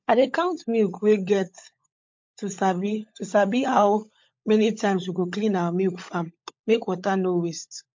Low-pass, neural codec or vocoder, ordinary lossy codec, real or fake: 7.2 kHz; codec, 16 kHz, 16 kbps, FunCodec, trained on LibriTTS, 50 frames a second; MP3, 48 kbps; fake